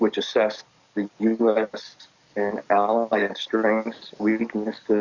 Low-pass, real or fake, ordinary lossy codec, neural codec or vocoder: 7.2 kHz; fake; Opus, 64 kbps; codec, 44.1 kHz, 7.8 kbps, DAC